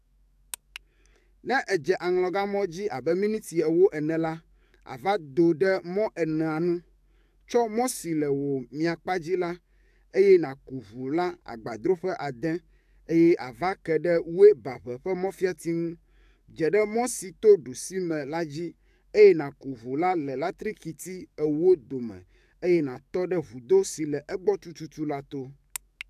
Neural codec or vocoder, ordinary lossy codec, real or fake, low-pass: codec, 44.1 kHz, 7.8 kbps, DAC; AAC, 96 kbps; fake; 14.4 kHz